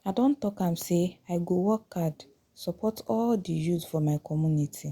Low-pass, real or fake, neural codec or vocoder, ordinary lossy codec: 19.8 kHz; real; none; Opus, 64 kbps